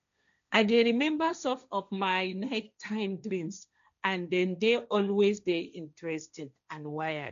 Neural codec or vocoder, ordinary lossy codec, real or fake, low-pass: codec, 16 kHz, 1.1 kbps, Voila-Tokenizer; MP3, 64 kbps; fake; 7.2 kHz